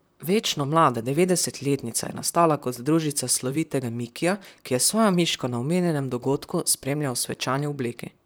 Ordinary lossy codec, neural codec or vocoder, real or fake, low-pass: none; vocoder, 44.1 kHz, 128 mel bands, Pupu-Vocoder; fake; none